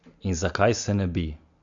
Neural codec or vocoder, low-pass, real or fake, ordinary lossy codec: none; 7.2 kHz; real; MP3, 64 kbps